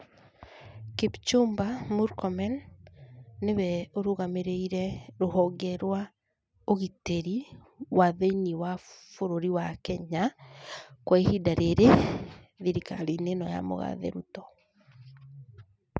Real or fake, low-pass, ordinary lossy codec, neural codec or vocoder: real; none; none; none